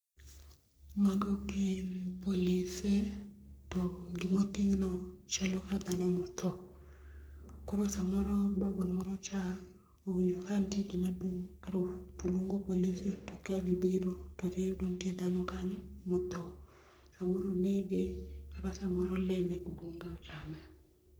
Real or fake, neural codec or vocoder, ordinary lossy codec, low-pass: fake; codec, 44.1 kHz, 3.4 kbps, Pupu-Codec; none; none